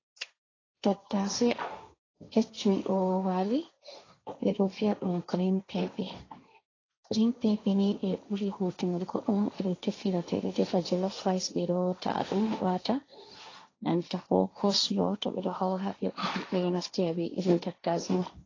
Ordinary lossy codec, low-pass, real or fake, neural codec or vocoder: AAC, 32 kbps; 7.2 kHz; fake; codec, 16 kHz, 1.1 kbps, Voila-Tokenizer